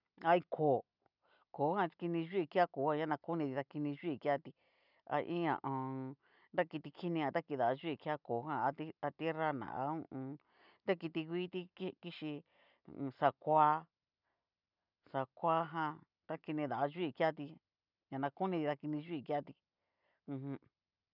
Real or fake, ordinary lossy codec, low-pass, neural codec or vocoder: real; none; 5.4 kHz; none